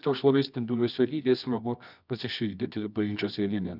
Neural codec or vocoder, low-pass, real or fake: codec, 24 kHz, 0.9 kbps, WavTokenizer, medium music audio release; 5.4 kHz; fake